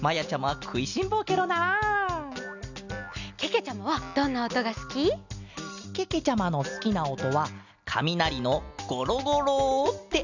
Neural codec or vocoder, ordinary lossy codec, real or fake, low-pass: none; none; real; 7.2 kHz